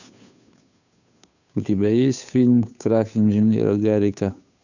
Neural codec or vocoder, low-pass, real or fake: codec, 16 kHz, 2 kbps, FunCodec, trained on Chinese and English, 25 frames a second; 7.2 kHz; fake